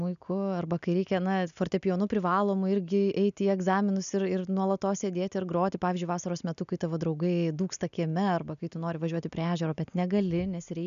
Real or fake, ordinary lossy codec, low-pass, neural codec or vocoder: real; MP3, 96 kbps; 7.2 kHz; none